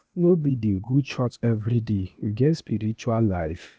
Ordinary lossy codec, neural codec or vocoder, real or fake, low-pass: none; codec, 16 kHz, 0.8 kbps, ZipCodec; fake; none